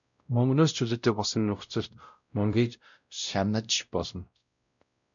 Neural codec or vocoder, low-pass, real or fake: codec, 16 kHz, 0.5 kbps, X-Codec, WavLM features, trained on Multilingual LibriSpeech; 7.2 kHz; fake